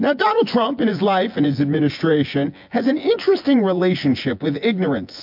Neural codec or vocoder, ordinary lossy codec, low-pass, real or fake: vocoder, 24 kHz, 100 mel bands, Vocos; MP3, 48 kbps; 5.4 kHz; fake